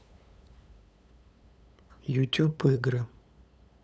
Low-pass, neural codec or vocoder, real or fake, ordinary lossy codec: none; codec, 16 kHz, 8 kbps, FunCodec, trained on LibriTTS, 25 frames a second; fake; none